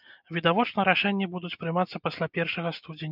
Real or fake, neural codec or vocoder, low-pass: real; none; 5.4 kHz